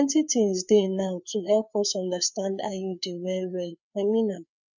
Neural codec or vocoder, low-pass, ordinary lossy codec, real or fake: codec, 16 kHz, 4 kbps, FreqCodec, larger model; 7.2 kHz; none; fake